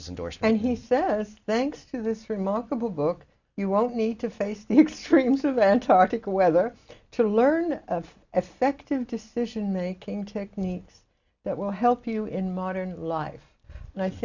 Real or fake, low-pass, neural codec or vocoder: real; 7.2 kHz; none